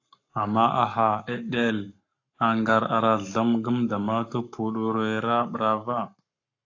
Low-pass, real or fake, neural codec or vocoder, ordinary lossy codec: 7.2 kHz; fake; codec, 44.1 kHz, 7.8 kbps, Pupu-Codec; AAC, 48 kbps